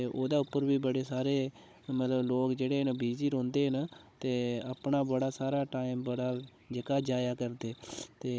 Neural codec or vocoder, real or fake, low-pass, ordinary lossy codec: codec, 16 kHz, 16 kbps, FunCodec, trained on Chinese and English, 50 frames a second; fake; none; none